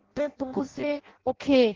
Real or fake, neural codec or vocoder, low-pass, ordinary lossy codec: fake; codec, 16 kHz in and 24 kHz out, 0.6 kbps, FireRedTTS-2 codec; 7.2 kHz; Opus, 16 kbps